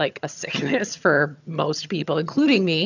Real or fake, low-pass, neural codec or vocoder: fake; 7.2 kHz; vocoder, 22.05 kHz, 80 mel bands, HiFi-GAN